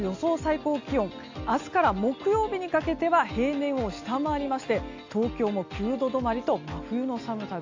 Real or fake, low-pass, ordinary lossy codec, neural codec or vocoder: real; 7.2 kHz; AAC, 48 kbps; none